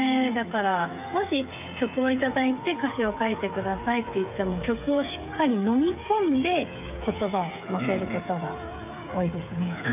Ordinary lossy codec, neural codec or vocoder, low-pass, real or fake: none; codec, 16 kHz, 8 kbps, FreqCodec, smaller model; 3.6 kHz; fake